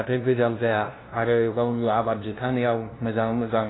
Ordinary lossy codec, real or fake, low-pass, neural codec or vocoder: AAC, 16 kbps; fake; 7.2 kHz; codec, 16 kHz, 0.5 kbps, FunCodec, trained on LibriTTS, 25 frames a second